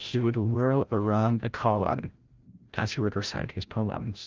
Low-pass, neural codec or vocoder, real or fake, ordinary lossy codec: 7.2 kHz; codec, 16 kHz, 0.5 kbps, FreqCodec, larger model; fake; Opus, 24 kbps